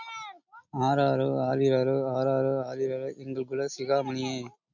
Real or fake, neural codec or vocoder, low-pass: real; none; 7.2 kHz